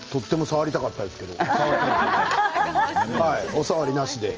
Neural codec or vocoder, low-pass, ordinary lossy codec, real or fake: none; 7.2 kHz; Opus, 24 kbps; real